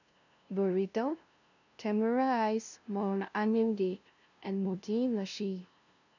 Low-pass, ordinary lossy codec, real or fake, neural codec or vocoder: 7.2 kHz; none; fake; codec, 16 kHz, 0.5 kbps, FunCodec, trained on LibriTTS, 25 frames a second